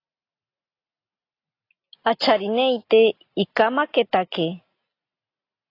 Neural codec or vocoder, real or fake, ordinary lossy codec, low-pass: none; real; AAC, 32 kbps; 5.4 kHz